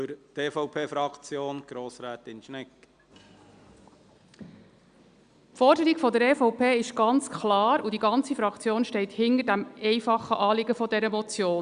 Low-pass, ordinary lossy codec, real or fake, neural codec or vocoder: 9.9 kHz; Opus, 64 kbps; real; none